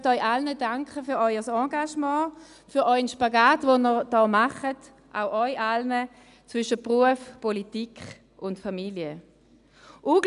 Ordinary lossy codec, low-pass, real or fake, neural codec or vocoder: none; 10.8 kHz; real; none